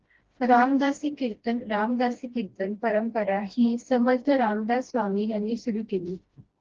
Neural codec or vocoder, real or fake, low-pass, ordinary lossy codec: codec, 16 kHz, 1 kbps, FreqCodec, smaller model; fake; 7.2 kHz; Opus, 16 kbps